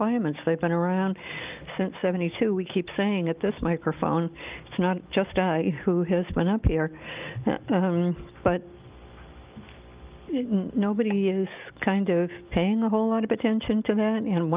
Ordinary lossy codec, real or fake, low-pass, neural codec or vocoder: Opus, 24 kbps; real; 3.6 kHz; none